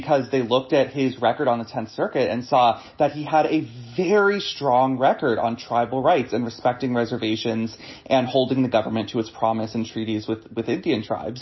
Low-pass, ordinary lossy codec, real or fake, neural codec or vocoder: 7.2 kHz; MP3, 24 kbps; real; none